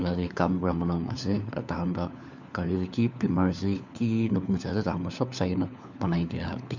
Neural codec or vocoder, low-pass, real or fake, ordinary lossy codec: codec, 16 kHz, 4 kbps, FunCodec, trained on LibriTTS, 50 frames a second; 7.2 kHz; fake; none